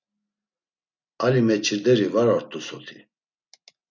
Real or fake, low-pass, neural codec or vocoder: real; 7.2 kHz; none